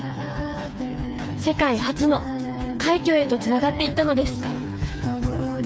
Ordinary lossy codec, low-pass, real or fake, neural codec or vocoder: none; none; fake; codec, 16 kHz, 4 kbps, FreqCodec, smaller model